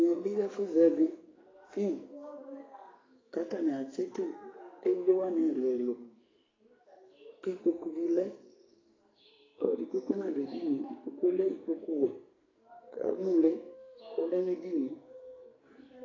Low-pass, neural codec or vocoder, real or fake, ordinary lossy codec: 7.2 kHz; codec, 44.1 kHz, 2.6 kbps, SNAC; fake; AAC, 32 kbps